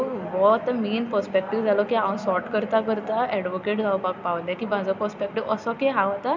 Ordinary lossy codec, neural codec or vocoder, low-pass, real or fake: MP3, 64 kbps; none; 7.2 kHz; real